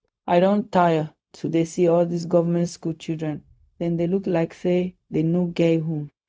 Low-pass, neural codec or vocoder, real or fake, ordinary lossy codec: none; codec, 16 kHz, 0.4 kbps, LongCat-Audio-Codec; fake; none